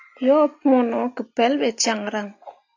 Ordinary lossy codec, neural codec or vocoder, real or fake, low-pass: AAC, 48 kbps; none; real; 7.2 kHz